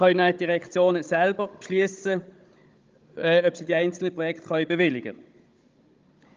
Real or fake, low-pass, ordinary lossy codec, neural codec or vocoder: fake; 7.2 kHz; Opus, 24 kbps; codec, 16 kHz, 16 kbps, FunCodec, trained on Chinese and English, 50 frames a second